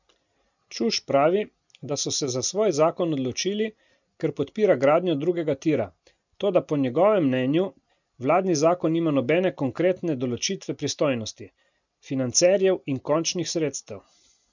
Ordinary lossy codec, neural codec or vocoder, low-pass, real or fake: none; none; 7.2 kHz; real